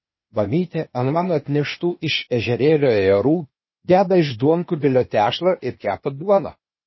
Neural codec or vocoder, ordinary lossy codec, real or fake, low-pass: codec, 16 kHz, 0.8 kbps, ZipCodec; MP3, 24 kbps; fake; 7.2 kHz